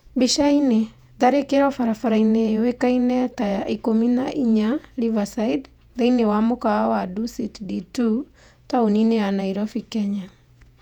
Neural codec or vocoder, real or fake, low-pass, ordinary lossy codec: vocoder, 48 kHz, 128 mel bands, Vocos; fake; 19.8 kHz; none